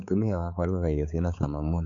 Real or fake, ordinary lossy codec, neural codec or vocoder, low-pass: fake; none; codec, 16 kHz, 4 kbps, X-Codec, HuBERT features, trained on balanced general audio; 7.2 kHz